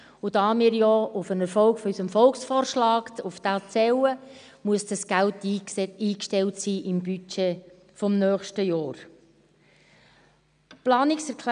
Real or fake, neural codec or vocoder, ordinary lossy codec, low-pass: real; none; none; 9.9 kHz